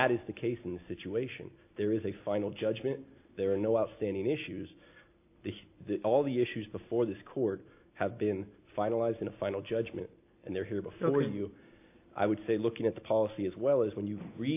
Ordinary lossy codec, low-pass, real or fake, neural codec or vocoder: AAC, 32 kbps; 3.6 kHz; real; none